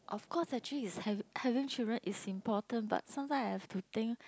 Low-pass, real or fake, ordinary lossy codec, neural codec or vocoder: none; real; none; none